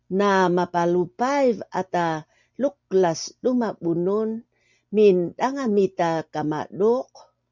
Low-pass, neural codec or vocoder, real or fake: 7.2 kHz; none; real